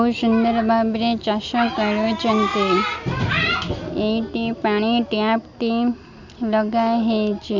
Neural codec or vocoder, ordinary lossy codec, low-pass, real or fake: none; none; 7.2 kHz; real